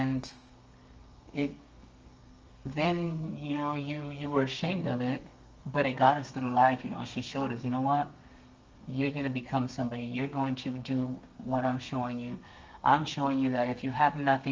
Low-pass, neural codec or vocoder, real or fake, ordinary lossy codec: 7.2 kHz; codec, 32 kHz, 1.9 kbps, SNAC; fake; Opus, 24 kbps